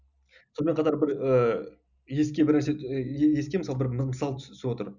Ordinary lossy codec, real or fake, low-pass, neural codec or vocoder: none; real; 7.2 kHz; none